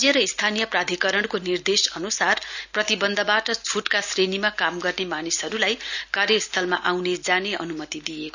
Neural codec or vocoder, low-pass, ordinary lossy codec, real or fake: none; 7.2 kHz; none; real